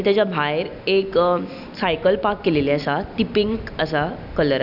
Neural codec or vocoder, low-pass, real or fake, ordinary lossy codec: none; 5.4 kHz; real; none